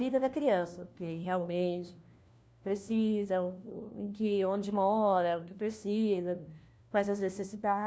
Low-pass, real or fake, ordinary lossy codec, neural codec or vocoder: none; fake; none; codec, 16 kHz, 1 kbps, FunCodec, trained on LibriTTS, 50 frames a second